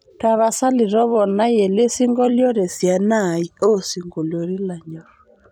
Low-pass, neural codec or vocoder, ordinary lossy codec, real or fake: 19.8 kHz; vocoder, 44.1 kHz, 128 mel bands every 256 samples, BigVGAN v2; none; fake